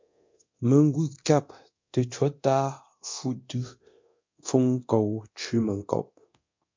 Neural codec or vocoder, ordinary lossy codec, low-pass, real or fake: codec, 24 kHz, 0.9 kbps, DualCodec; MP3, 48 kbps; 7.2 kHz; fake